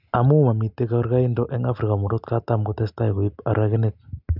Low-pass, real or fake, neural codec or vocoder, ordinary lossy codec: 5.4 kHz; real; none; none